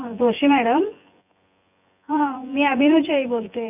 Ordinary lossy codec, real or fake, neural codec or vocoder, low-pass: none; fake; vocoder, 24 kHz, 100 mel bands, Vocos; 3.6 kHz